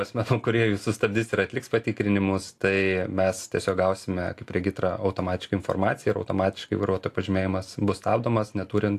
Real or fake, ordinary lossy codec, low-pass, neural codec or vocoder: real; AAC, 64 kbps; 14.4 kHz; none